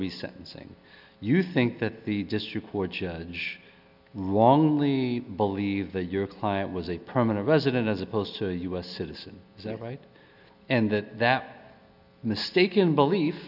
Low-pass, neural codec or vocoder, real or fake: 5.4 kHz; none; real